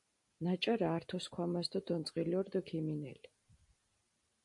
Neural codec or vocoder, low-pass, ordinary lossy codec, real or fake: none; 10.8 kHz; MP3, 48 kbps; real